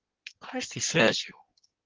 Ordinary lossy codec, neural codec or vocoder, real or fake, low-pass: Opus, 16 kbps; codec, 16 kHz in and 24 kHz out, 1.1 kbps, FireRedTTS-2 codec; fake; 7.2 kHz